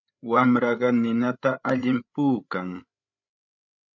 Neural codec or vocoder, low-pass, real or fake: codec, 16 kHz, 8 kbps, FreqCodec, larger model; 7.2 kHz; fake